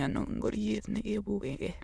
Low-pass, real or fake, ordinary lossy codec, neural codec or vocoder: none; fake; none; autoencoder, 22.05 kHz, a latent of 192 numbers a frame, VITS, trained on many speakers